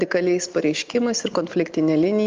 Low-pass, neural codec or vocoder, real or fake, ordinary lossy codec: 7.2 kHz; none; real; Opus, 24 kbps